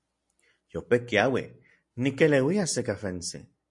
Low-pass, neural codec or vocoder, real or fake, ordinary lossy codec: 10.8 kHz; none; real; MP3, 48 kbps